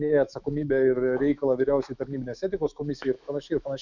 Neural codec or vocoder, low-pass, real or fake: autoencoder, 48 kHz, 128 numbers a frame, DAC-VAE, trained on Japanese speech; 7.2 kHz; fake